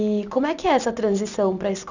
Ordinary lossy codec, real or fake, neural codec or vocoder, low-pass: none; real; none; 7.2 kHz